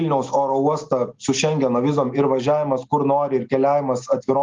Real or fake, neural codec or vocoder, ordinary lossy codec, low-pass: real; none; Opus, 32 kbps; 7.2 kHz